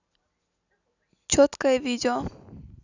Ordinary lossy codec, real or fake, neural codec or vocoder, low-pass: none; real; none; 7.2 kHz